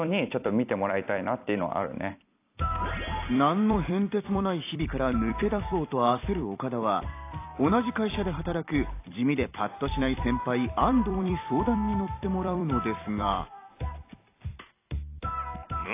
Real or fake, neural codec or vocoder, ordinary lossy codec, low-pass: fake; vocoder, 44.1 kHz, 128 mel bands every 256 samples, BigVGAN v2; AAC, 24 kbps; 3.6 kHz